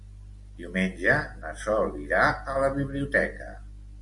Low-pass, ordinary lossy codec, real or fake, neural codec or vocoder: 10.8 kHz; MP3, 64 kbps; real; none